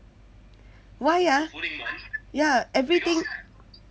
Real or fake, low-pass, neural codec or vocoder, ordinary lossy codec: real; none; none; none